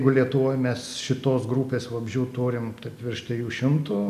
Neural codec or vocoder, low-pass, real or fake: none; 14.4 kHz; real